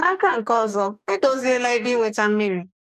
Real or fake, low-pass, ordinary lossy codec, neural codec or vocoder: fake; 14.4 kHz; none; codec, 44.1 kHz, 2.6 kbps, DAC